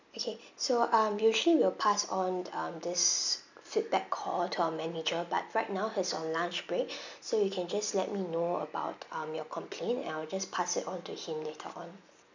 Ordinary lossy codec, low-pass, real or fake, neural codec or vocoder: none; 7.2 kHz; real; none